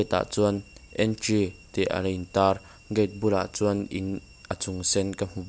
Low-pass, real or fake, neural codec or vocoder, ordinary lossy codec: none; real; none; none